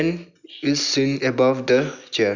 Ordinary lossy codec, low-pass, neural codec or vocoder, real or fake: none; 7.2 kHz; none; real